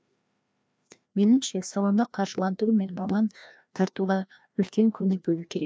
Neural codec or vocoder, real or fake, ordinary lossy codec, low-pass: codec, 16 kHz, 1 kbps, FreqCodec, larger model; fake; none; none